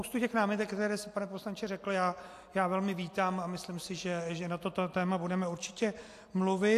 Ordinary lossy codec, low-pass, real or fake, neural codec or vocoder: AAC, 64 kbps; 14.4 kHz; real; none